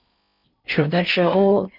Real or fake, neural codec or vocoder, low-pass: fake; codec, 16 kHz in and 24 kHz out, 0.6 kbps, FocalCodec, streaming, 4096 codes; 5.4 kHz